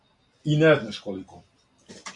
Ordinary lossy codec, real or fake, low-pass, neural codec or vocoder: AAC, 48 kbps; real; 10.8 kHz; none